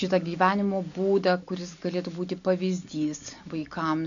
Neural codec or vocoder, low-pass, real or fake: none; 7.2 kHz; real